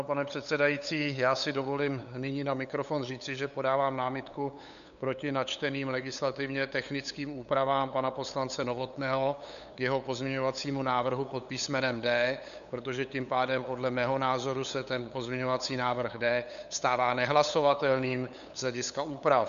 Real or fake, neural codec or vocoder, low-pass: fake; codec, 16 kHz, 8 kbps, FunCodec, trained on LibriTTS, 25 frames a second; 7.2 kHz